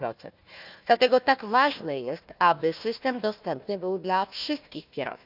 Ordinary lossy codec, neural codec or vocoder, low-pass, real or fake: none; codec, 16 kHz, 1 kbps, FunCodec, trained on Chinese and English, 50 frames a second; 5.4 kHz; fake